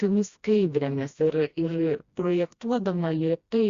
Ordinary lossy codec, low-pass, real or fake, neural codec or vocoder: Opus, 64 kbps; 7.2 kHz; fake; codec, 16 kHz, 1 kbps, FreqCodec, smaller model